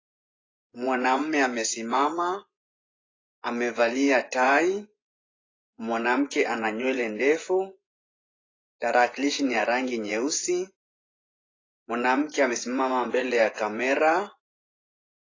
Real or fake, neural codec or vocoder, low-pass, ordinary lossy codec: fake; vocoder, 24 kHz, 100 mel bands, Vocos; 7.2 kHz; AAC, 32 kbps